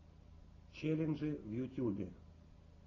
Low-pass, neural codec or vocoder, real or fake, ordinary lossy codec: 7.2 kHz; none; real; AAC, 32 kbps